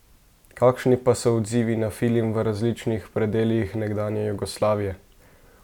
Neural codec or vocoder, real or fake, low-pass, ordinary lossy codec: none; real; 19.8 kHz; none